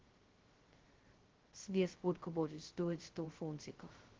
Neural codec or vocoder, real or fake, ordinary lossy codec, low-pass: codec, 16 kHz, 0.2 kbps, FocalCodec; fake; Opus, 16 kbps; 7.2 kHz